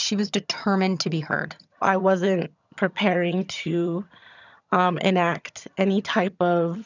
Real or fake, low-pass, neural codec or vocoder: fake; 7.2 kHz; vocoder, 22.05 kHz, 80 mel bands, HiFi-GAN